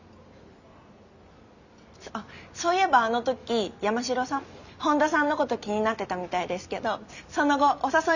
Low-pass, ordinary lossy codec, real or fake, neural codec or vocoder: 7.2 kHz; none; real; none